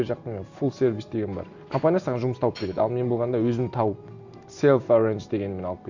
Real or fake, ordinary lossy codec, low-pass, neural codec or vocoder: real; none; 7.2 kHz; none